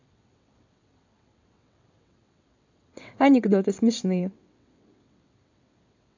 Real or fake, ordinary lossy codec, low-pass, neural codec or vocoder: fake; AAC, 48 kbps; 7.2 kHz; vocoder, 22.05 kHz, 80 mel bands, WaveNeXt